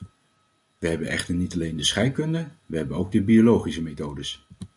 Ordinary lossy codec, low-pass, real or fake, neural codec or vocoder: MP3, 96 kbps; 10.8 kHz; real; none